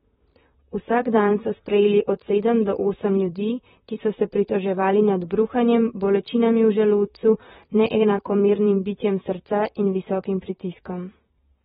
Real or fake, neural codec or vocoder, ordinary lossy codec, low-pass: fake; vocoder, 44.1 kHz, 128 mel bands, Pupu-Vocoder; AAC, 16 kbps; 19.8 kHz